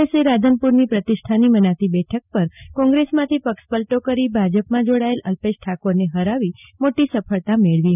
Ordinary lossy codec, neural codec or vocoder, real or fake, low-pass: none; none; real; 3.6 kHz